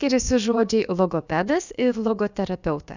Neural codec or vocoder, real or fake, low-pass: codec, 16 kHz, about 1 kbps, DyCAST, with the encoder's durations; fake; 7.2 kHz